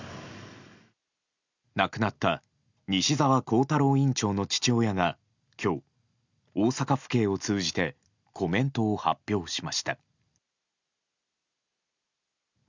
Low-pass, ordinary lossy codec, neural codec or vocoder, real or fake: 7.2 kHz; none; none; real